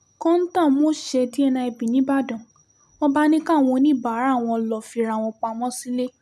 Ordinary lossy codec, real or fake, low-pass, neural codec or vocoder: none; real; 14.4 kHz; none